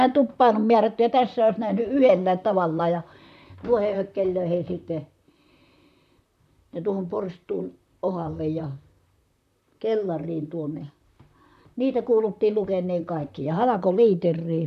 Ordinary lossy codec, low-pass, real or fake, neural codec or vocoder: none; 14.4 kHz; fake; vocoder, 44.1 kHz, 128 mel bands, Pupu-Vocoder